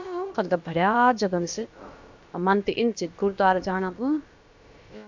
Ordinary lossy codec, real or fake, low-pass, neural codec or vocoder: MP3, 64 kbps; fake; 7.2 kHz; codec, 16 kHz, about 1 kbps, DyCAST, with the encoder's durations